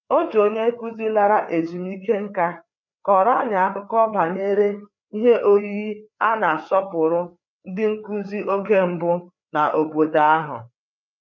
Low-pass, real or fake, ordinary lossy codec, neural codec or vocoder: 7.2 kHz; fake; none; codec, 16 kHz, 4 kbps, FreqCodec, larger model